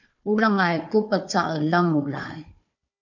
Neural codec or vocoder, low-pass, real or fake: codec, 16 kHz, 4 kbps, FunCodec, trained on Chinese and English, 50 frames a second; 7.2 kHz; fake